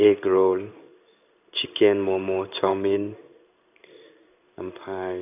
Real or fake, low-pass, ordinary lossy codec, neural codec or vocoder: fake; 3.6 kHz; none; codec, 16 kHz in and 24 kHz out, 1 kbps, XY-Tokenizer